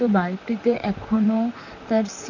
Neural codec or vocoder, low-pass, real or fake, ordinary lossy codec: vocoder, 44.1 kHz, 128 mel bands, Pupu-Vocoder; 7.2 kHz; fake; none